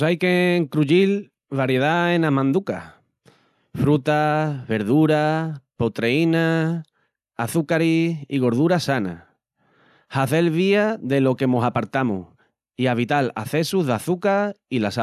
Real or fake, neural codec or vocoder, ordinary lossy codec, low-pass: real; none; none; 14.4 kHz